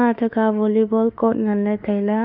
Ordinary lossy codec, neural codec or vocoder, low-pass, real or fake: none; autoencoder, 48 kHz, 32 numbers a frame, DAC-VAE, trained on Japanese speech; 5.4 kHz; fake